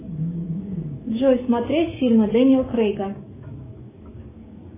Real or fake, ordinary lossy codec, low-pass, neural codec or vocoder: fake; MP3, 16 kbps; 3.6 kHz; codec, 16 kHz, 6 kbps, DAC